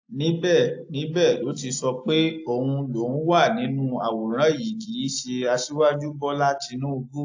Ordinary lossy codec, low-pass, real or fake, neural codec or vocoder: AAC, 48 kbps; 7.2 kHz; real; none